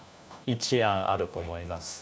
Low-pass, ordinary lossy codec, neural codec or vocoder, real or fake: none; none; codec, 16 kHz, 1 kbps, FunCodec, trained on LibriTTS, 50 frames a second; fake